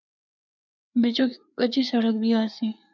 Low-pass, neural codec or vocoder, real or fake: 7.2 kHz; codec, 16 kHz, 4 kbps, FreqCodec, larger model; fake